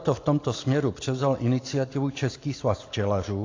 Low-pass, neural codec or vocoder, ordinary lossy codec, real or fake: 7.2 kHz; none; AAC, 32 kbps; real